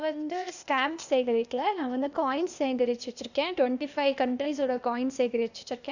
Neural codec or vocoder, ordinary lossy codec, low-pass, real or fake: codec, 16 kHz, 0.8 kbps, ZipCodec; none; 7.2 kHz; fake